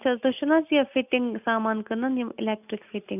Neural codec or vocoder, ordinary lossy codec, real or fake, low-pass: none; none; real; 3.6 kHz